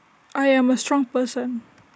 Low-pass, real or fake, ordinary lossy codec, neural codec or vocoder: none; real; none; none